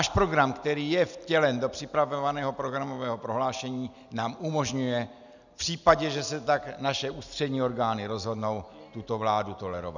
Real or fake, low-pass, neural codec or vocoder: real; 7.2 kHz; none